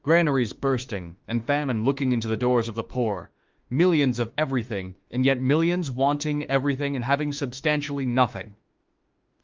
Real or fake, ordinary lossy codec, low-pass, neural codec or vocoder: fake; Opus, 32 kbps; 7.2 kHz; autoencoder, 48 kHz, 32 numbers a frame, DAC-VAE, trained on Japanese speech